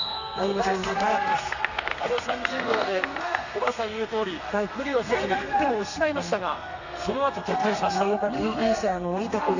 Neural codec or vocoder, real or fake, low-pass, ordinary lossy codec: codec, 32 kHz, 1.9 kbps, SNAC; fake; 7.2 kHz; none